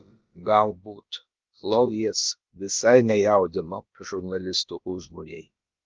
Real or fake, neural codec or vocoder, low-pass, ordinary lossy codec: fake; codec, 16 kHz, about 1 kbps, DyCAST, with the encoder's durations; 7.2 kHz; Opus, 32 kbps